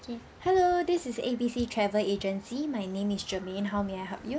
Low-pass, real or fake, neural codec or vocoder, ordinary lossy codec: none; real; none; none